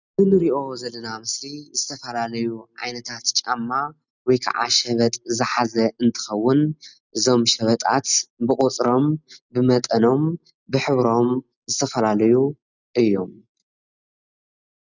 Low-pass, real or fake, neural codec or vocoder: 7.2 kHz; real; none